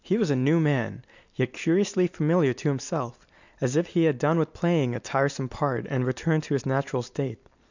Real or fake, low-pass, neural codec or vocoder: real; 7.2 kHz; none